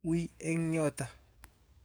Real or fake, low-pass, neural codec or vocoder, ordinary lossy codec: fake; none; codec, 44.1 kHz, 7.8 kbps, DAC; none